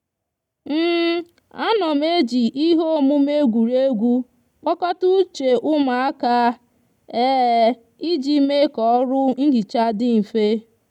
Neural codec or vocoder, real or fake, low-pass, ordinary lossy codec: none; real; 19.8 kHz; none